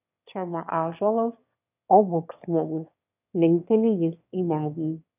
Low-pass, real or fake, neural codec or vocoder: 3.6 kHz; fake; autoencoder, 22.05 kHz, a latent of 192 numbers a frame, VITS, trained on one speaker